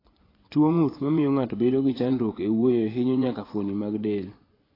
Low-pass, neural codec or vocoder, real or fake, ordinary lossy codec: 5.4 kHz; vocoder, 24 kHz, 100 mel bands, Vocos; fake; AAC, 24 kbps